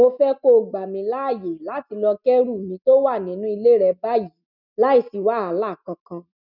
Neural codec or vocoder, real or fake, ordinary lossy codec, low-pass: none; real; none; 5.4 kHz